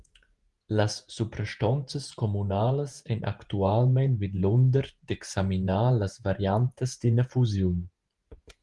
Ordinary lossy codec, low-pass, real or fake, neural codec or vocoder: Opus, 24 kbps; 10.8 kHz; fake; vocoder, 48 kHz, 128 mel bands, Vocos